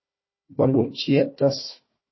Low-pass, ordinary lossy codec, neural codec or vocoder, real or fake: 7.2 kHz; MP3, 24 kbps; codec, 16 kHz, 1 kbps, FunCodec, trained on Chinese and English, 50 frames a second; fake